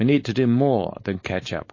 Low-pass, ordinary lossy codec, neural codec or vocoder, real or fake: 7.2 kHz; MP3, 32 kbps; codec, 16 kHz, 4.8 kbps, FACodec; fake